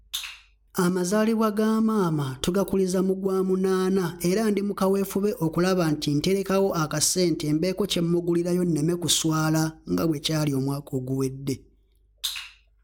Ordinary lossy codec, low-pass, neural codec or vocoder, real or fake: none; none; none; real